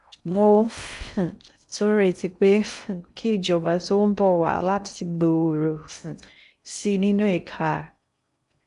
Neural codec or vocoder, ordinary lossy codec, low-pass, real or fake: codec, 16 kHz in and 24 kHz out, 0.6 kbps, FocalCodec, streaming, 4096 codes; none; 10.8 kHz; fake